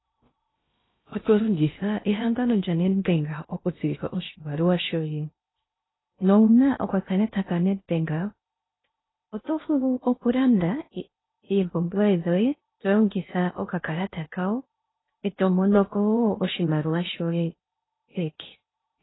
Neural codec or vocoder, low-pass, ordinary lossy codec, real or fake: codec, 16 kHz in and 24 kHz out, 0.6 kbps, FocalCodec, streaming, 2048 codes; 7.2 kHz; AAC, 16 kbps; fake